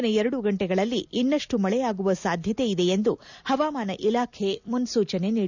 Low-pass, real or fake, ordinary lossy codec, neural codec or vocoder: 7.2 kHz; real; none; none